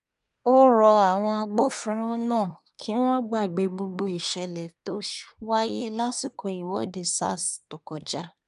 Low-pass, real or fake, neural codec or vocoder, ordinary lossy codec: 10.8 kHz; fake; codec, 24 kHz, 1 kbps, SNAC; none